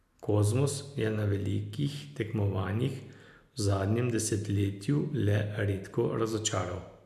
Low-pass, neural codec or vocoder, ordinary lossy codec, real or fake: 14.4 kHz; none; none; real